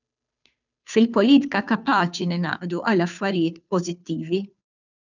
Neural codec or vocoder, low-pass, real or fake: codec, 16 kHz, 2 kbps, FunCodec, trained on Chinese and English, 25 frames a second; 7.2 kHz; fake